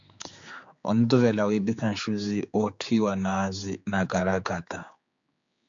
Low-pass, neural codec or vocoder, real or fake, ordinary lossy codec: 7.2 kHz; codec, 16 kHz, 4 kbps, X-Codec, HuBERT features, trained on general audio; fake; MP3, 64 kbps